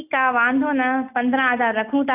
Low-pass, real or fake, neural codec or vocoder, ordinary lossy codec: 3.6 kHz; real; none; none